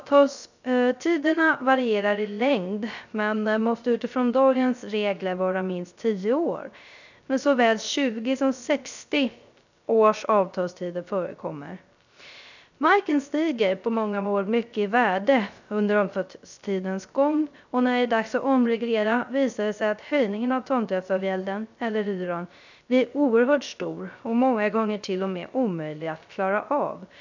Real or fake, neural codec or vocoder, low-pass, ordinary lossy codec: fake; codec, 16 kHz, 0.3 kbps, FocalCodec; 7.2 kHz; none